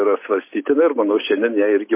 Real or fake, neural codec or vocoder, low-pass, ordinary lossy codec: real; none; 3.6 kHz; MP3, 24 kbps